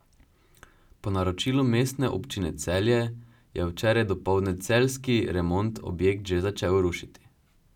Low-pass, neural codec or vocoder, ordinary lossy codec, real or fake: 19.8 kHz; none; none; real